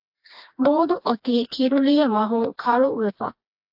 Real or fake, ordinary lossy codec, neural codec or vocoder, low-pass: fake; AAC, 48 kbps; codec, 16 kHz, 2 kbps, FreqCodec, smaller model; 5.4 kHz